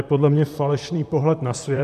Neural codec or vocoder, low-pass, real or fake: vocoder, 44.1 kHz, 128 mel bands, Pupu-Vocoder; 14.4 kHz; fake